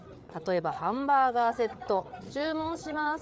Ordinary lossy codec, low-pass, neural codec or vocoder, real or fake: none; none; codec, 16 kHz, 8 kbps, FreqCodec, larger model; fake